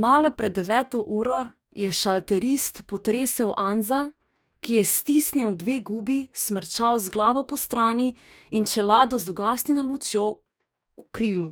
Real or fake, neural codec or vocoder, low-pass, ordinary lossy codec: fake; codec, 44.1 kHz, 2.6 kbps, DAC; none; none